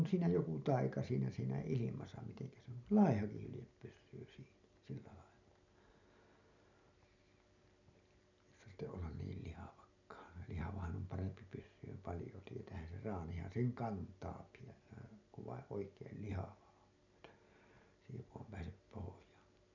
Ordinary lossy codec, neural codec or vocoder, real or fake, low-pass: none; none; real; 7.2 kHz